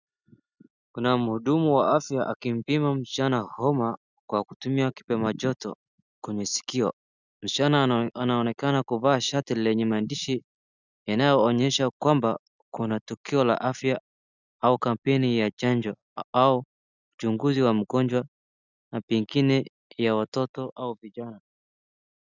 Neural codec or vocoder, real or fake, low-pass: none; real; 7.2 kHz